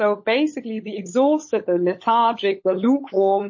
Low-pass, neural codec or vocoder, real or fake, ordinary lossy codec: 7.2 kHz; codec, 16 kHz, 16 kbps, FunCodec, trained on LibriTTS, 50 frames a second; fake; MP3, 32 kbps